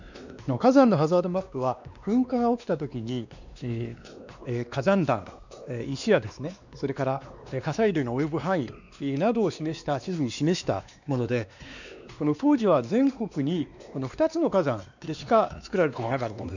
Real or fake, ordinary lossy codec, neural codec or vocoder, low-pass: fake; none; codec, 16 kHz, 2 kbps, X-Codec, WavLM features, trained on Multilingual LibriSpeech; 7.2 kHz